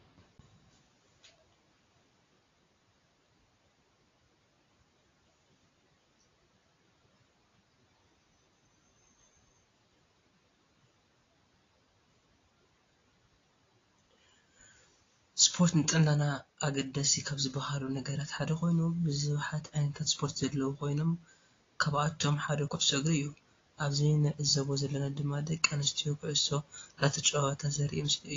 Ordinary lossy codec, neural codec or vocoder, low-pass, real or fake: AAC, 32 kbps; none; 7.2 kHz; real